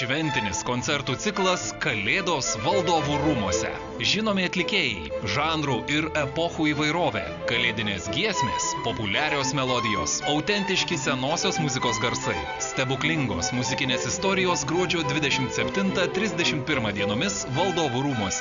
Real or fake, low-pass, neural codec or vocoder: real; 7.2 kHz; none